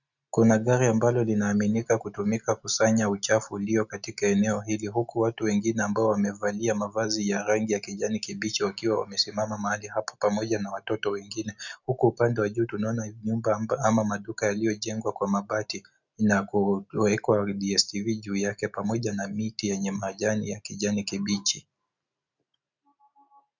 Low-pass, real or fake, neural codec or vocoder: 7.2 kHz; real; none